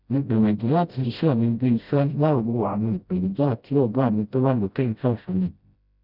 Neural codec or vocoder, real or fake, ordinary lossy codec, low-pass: codec, 16 kHz, 0.5 kbps, FreqCodec, smaller model; fake; none; 5.4 kHz